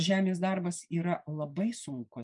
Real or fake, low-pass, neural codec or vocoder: fake; 10.8 kHz; vocoder, 24 kHz, 100 mel bands, Vocos